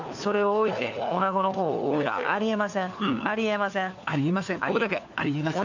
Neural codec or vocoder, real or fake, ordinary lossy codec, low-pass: codec, 16 kHz, 4 kbps, FunCodec, trained on LibriTTS, 50 frames a second; fake; none; 7.2 kHz